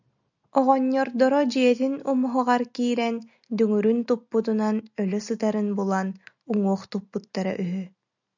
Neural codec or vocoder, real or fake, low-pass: none; real; 7.2 kHz